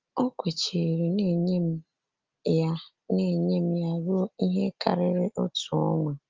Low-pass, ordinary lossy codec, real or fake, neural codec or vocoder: 7.2 kHz; Opus, 32 kbps; real; none